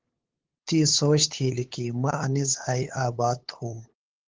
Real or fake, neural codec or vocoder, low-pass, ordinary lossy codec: fake; codec, 16 kHz, 8 kbps, FunCodec, trained on LibriTTS, 25 frames a second; 7.2 kHz; Opus, 16 kbps